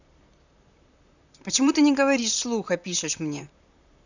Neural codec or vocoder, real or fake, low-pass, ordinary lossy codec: vocoder, 44.1 kHz, 128 mel bands, Pupu-Vocoder; fake; 7.2 kHz; none